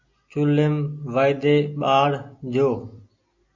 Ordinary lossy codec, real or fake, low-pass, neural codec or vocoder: MP3, 48 kbps; real; 7.2 kHz; none